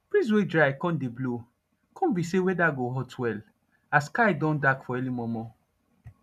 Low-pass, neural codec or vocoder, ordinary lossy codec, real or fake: 14.4 kHz; none; none; real